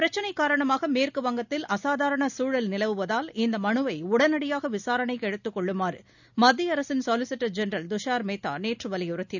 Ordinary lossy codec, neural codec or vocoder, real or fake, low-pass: none; none; real; 7.2 kHz